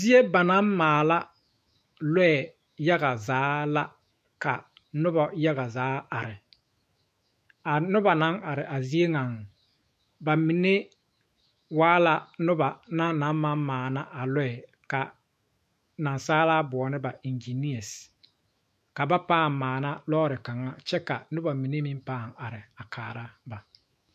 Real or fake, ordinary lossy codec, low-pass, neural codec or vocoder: fake; MP3, 64 kbps; 14.4 kHz; autoencoder, 48 kHz, 128 numbers a frame, DAC-VAE, trained on Japanese speech